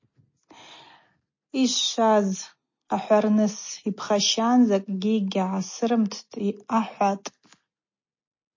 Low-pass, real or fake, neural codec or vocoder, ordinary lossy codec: 7.2 kHz; real; none; MP3, 32 kbps